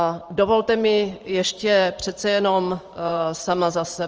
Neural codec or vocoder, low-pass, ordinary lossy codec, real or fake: vocoder, 44.1 kHz, 128 mel bands every 512 samples, BigVGAN v2; 7.2 kHz; Opus, 24 kbps; fake